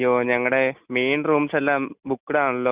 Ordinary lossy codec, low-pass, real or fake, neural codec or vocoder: Opus, 64 kbps; 3.6 kHz; real; none